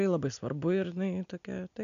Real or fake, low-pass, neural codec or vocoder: real; 7.2 kHz; none